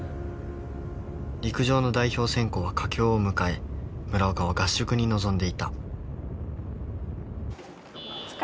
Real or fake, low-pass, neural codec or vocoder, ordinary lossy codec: real; none; none; none